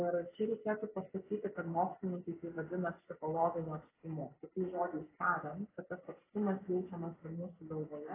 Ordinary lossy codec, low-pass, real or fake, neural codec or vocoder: AAC, 16 kbps; 3.6 kHz; real; none